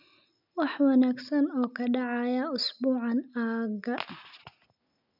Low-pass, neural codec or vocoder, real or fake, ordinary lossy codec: 5.4 kHz; none; real; none